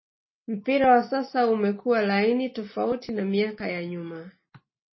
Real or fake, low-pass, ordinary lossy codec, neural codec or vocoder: real; 7.2 kHz; MP3, 24 kbps; none